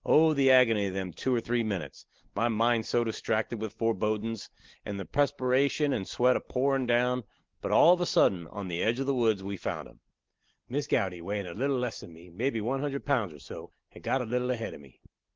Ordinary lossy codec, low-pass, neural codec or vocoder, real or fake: Opus, 16 kbps; 7.2 kHz; none; real